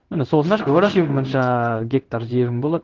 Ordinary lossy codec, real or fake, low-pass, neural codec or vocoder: Opus, 16 kbps; fake; 7.2 kHz; codec, 16 kHz in and 24 kHz out, 1 kbps, XY-Tokenizer